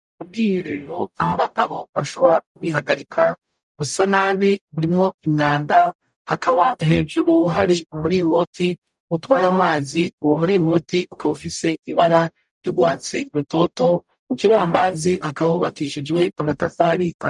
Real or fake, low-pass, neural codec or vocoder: fake; 10.8 kHz; codec, 44.1 kHz, 0.9 kbps, DAC